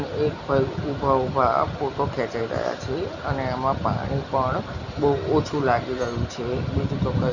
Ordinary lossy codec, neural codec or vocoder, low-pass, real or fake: none; none; 7.2 kHz; real